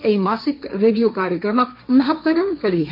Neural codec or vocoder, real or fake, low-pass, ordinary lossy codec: codec, 16 kHz, 1.1 kbps, Voila-Tokenizer; fake; 5.4 kHz; MP3, 32 kbps